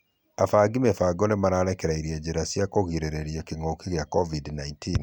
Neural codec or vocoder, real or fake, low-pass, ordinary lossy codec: none; real; 19.8 kHz; none